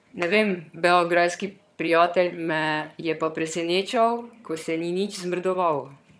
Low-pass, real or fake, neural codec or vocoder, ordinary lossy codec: none; fake; vocoder, 22.05 kHz, 80 mel bands, HiFi-GAN; none